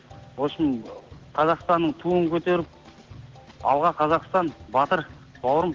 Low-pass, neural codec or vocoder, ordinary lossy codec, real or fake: 7.2 kHz; none; Opus, 16 kbps; real